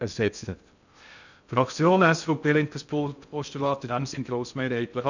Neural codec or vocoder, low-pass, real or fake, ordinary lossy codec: codec, 16 kHz in and 24 kHz out, 0.6 kbps, FocalCodec, streaming, 2048 codes; 7.2 kHz; fake; none